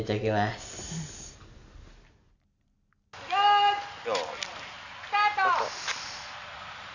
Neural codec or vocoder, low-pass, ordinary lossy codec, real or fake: none; 7.2 kHz; none; real